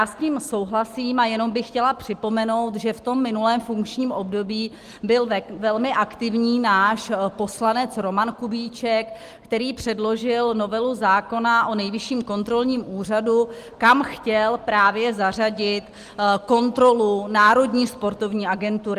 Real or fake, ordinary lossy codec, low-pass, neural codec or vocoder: real; Opus, 24 kbps; 14.4 kHz; none